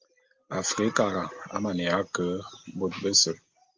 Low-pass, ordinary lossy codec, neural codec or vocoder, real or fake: 7.2 kHz; Opus, 32 kbps; none; real